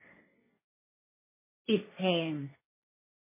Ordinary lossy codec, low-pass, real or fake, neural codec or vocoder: MP3, 16 kbps; 3.6 kHz; fake; codec, 16 kHz, 1.1 kbps, Voila-Tokenizer